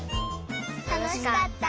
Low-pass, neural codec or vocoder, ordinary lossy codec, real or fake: none; none; none; real